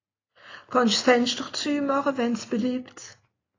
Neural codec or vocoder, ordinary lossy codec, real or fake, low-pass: none; AAC, 32 kbps; real; 7.2 kHz